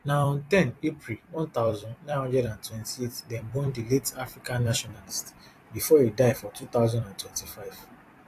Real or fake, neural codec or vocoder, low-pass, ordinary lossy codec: fake; vocoder, 44.1 kHz, 128 mel bands every 256 samples, BigVGAN v2; 14.4 kHz; AAC, 64 kbps